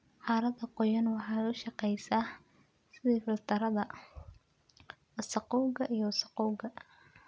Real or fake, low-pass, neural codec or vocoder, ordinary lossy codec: real; none; none; none